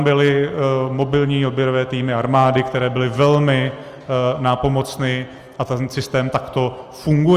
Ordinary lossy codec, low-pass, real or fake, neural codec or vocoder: Opus, 32 kbps; 14.4 kHz; real; none